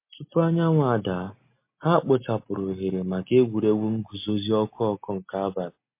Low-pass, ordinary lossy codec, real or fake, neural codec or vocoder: 3.6 kHz; MP3, 24 kbps; real; none